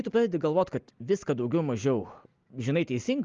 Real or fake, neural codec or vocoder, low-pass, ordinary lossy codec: real; none; 7.2 kHz; Opus, 32 kbps